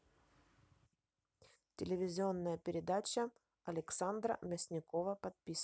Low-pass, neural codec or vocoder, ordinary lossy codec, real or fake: none; none; none; real